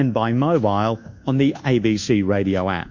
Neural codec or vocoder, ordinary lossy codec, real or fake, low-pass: codec, 24 kHz, 1.2 kbps, DualCodec; Opus, 64 kbps; fake; 7.2 kHz